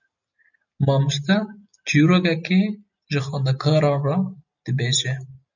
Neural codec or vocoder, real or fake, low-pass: none; real; 7.2 kHz